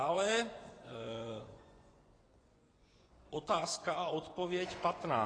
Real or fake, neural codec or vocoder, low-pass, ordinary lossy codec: fake; vocoder, 24 kHz, 100 mel bands, Vocos; 9.9 kHz; AAC, 32 kbps